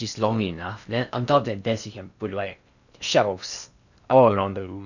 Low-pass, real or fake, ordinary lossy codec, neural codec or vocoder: 7.2 kHz; fake; none; codec, 16 kHz in and 24 kHz out, 0.8 kbps, FocalCodec, streaming, 65536 codes